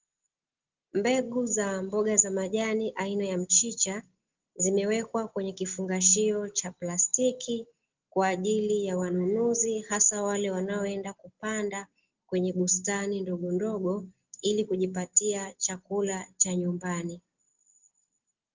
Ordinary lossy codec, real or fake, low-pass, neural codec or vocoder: Opus, 16 kbps; real; 7.2 kHz; none